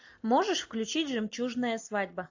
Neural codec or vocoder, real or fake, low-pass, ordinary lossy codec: none; real; 7.2 kHz; AAC, 48 kbps